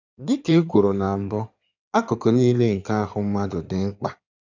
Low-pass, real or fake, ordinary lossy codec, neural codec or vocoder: 7.2 kHz; fake; none; codec, 16 kHz in and 24 kHz out, 2.2 kbps, FireRedTTS-2 codec